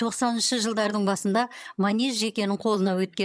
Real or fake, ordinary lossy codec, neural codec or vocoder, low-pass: fake; none; vocoder, 22.05 kHz, 80 mel bands, HiFi-GAN; none